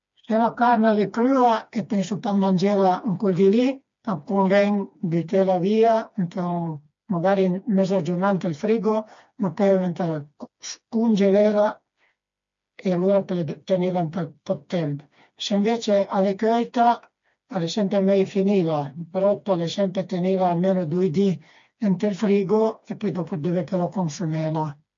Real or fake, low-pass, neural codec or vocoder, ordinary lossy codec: fake; 7.2 kHz; codec, 16 kHz, 2 kbps, FreqCodec, smaller model; MP3, 48 kbps